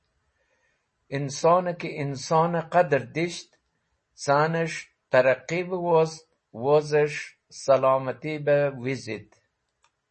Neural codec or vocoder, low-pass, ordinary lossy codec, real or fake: none; 10.8 kHz; MP3, 32 kbps; real